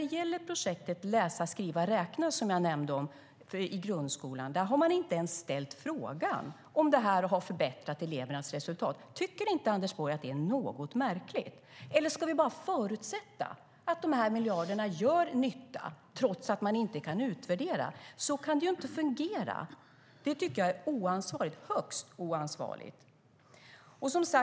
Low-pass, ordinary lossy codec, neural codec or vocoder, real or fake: none; none; none; real